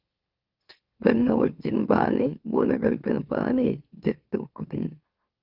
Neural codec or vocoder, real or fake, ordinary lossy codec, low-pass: autoencoder, 44.1 kHz, a latent of 192 numbers a frame, MeloTTS; fake; Opus, 32 kbps; 5.4 kHz